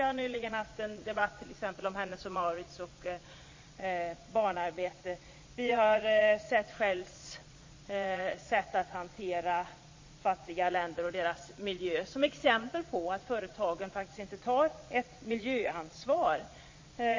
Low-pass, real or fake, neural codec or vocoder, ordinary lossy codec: 7.2 kHz; fake; vocoder, 22.05 kHz, 80 mel bands, Vocos; MP3, 32 kbps